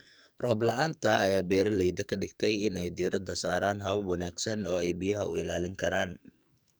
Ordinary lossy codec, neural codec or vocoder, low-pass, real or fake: none; codec, 44.1 kHz, 2.6 kbps, SNAC; none; fake